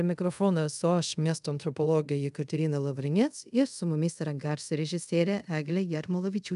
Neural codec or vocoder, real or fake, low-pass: codec, 24 kHz, 0.5 kbps, DualCodec; fake; 10.8 kHz